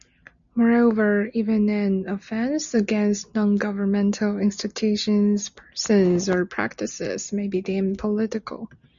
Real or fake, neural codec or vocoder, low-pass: real; none; 7.2 kHz